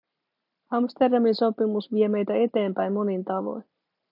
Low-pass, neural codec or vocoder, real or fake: 5.4 kHz; none; real